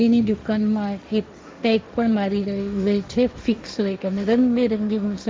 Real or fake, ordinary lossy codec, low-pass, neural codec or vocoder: fake; none; none; codec, 16 kHz, 1.1 kbps, Voila-Tokenizer